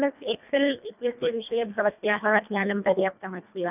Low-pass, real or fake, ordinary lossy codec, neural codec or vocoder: 3.6 kHz; fake; none; codec, 24 kHz, 1.5 kbps, HILCodec